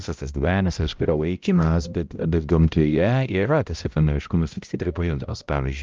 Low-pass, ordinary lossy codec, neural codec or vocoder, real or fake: 7.2 kHz; Opus, 24 kbps; codec, 16 kHz, 0.5 kbps, X-Codec, HuBERT features, trained on balanced general audio; fake